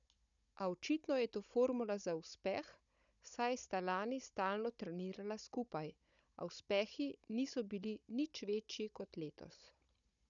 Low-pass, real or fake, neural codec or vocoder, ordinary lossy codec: 7.2 kHz; fake; codec, 16 kHz, 16 kbps, FunCodec, trained on Chinese and English, 50 frames a second; none